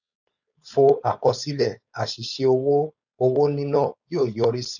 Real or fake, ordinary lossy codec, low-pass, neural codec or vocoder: fake; none; 7.2 kHz; codec, 16 kHz, 4.8 kbps, FACodec